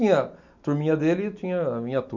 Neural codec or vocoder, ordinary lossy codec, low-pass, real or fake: none; none; 7.2 kHz; real